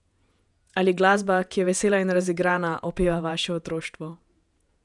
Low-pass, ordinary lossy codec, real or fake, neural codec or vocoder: 10.8 kHz; none; fake; vocoder, 48 kHz, 128 mel bands, Vocos